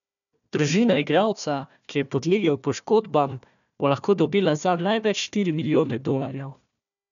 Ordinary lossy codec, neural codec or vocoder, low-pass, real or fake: none; codec, 16 kHz, 1 kbps, FunCodec, trained on Chinese and English, 50 frames a second; 7.2 kHz; fake